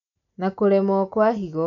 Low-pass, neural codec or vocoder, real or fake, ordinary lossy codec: 7.2 kHz; none; real; none